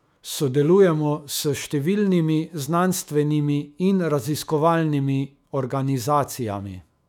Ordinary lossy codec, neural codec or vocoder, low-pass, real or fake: none; autoencoder, 48 kHz, 128 numbers a frame, DAC-VAE, trained on Japanese speech; 19.8 kHz; fake